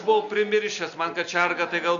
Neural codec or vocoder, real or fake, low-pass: none; real; 7.2 kHz